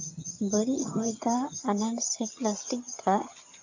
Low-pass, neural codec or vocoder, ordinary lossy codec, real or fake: 7.2 kHz; vocoder, 22.05 kHz, 80 mel bands, HiFi-GAN; AAC, 48 kbps; fake